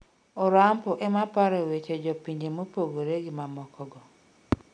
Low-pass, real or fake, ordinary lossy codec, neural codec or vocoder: 9.9 kHz; real; none; none